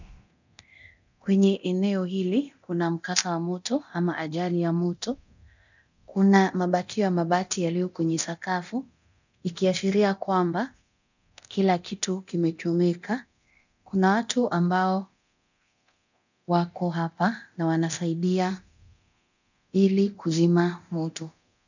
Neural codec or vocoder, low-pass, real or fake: codec, 24 kHz, 0.9 kbps, DualCodec; 7.2 kHz; fake